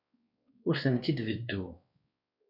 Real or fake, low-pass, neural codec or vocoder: fake; 5.4 kHz; codec, 16 kHz, 2 kbps, X-Codec, WavLM features, trained on Multilingual LibriSpeech